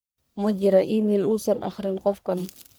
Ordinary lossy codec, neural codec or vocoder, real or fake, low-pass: none; codec, 44.1 kHz, 1.7 kbps, Pupu-Codec; fake; none